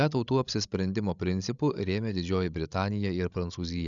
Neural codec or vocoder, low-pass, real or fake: codec, 16 kHz, 16 kbps, FreqCodec, larger model; 7.2 kHz; fake